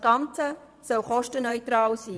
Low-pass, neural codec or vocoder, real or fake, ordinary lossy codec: none; vocoder, 22.05 kHz, 80 mel bands, Vocos; fake; none